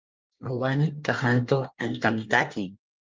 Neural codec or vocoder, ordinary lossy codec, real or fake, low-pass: codec, 24 kHz, 1 kbps, SNAC; Opus, 32 kbps; fake; 7.2 kHz